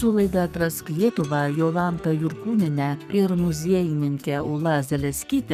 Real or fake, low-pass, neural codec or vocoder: fake; 14.4 kHz; codec, 44.1 kHz, 2.6 kbps, SNAC